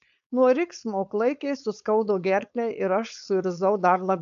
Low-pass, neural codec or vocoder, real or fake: 7.2 kHz; codec, 16 kHz, 4.8 kbps, FACodec; fake